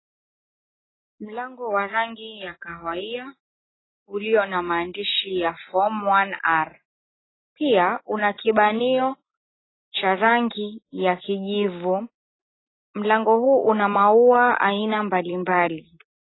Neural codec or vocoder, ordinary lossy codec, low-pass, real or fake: none; AAC, 16 kbps; 7.2 kHz; real